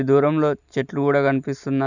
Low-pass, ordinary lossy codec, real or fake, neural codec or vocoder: 7.2 kHz; none; real; none